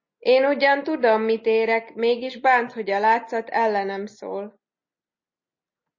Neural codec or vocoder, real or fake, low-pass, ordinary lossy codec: none; real; 7.2 kHz; MP3, 32 kbps